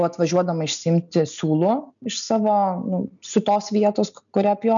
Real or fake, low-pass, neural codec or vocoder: real; 7.2 kHz; none